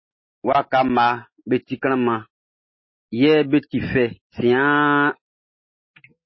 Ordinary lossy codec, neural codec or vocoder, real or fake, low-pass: MP3, 24 kbps; none; real; 7.2 kHz